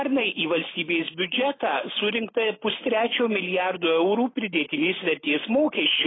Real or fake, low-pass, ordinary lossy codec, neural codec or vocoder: real; 7.2 kHz; AAC, 16 kbps; none